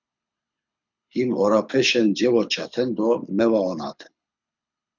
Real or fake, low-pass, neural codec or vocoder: fake; 7.2 kHz; codec, 24 kHz, 6 kbps, HILCodec